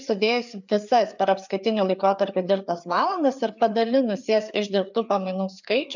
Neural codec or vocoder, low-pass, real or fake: codec, 16 kHz, 4 kbps, FreqCodec, larger model; 7.2 kHz; fake